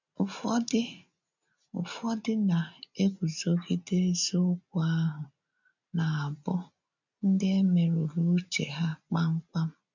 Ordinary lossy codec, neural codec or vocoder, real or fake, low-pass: none; none; real; 7.2 kHz